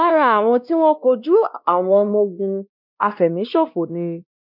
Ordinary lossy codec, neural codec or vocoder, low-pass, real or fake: none; codec, 16 kHz, 1 kbps, X-Codec, WavLM features, trained on Multilingual LibriSpeech; 5.4 kHz; fake